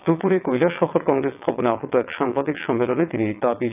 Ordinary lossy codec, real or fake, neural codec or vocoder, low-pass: none; fake; vocoder, 22.05 kHz, 80 mel bands, WaveNeXt; 3.6 kHz